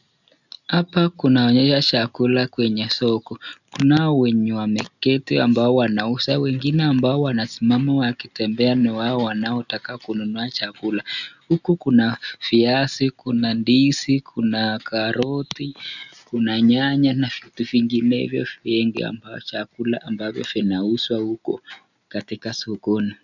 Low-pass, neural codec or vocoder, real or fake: 7.2 kHz; none; real